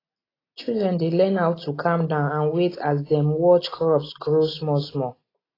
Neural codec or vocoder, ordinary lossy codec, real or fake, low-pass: none; AAC, 24 kbps; real; 5.4 kHz